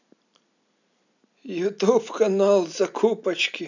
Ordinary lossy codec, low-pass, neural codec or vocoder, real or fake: none; 7.2 kHz; none; real